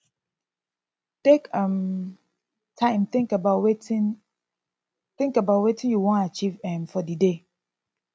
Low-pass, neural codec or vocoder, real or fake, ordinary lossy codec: none; none; real; none